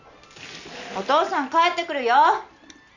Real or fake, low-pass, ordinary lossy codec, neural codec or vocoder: real; 7.2 kHz; none; none